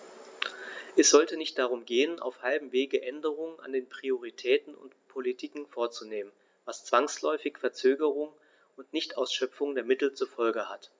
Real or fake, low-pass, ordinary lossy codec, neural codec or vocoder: real; none; none; none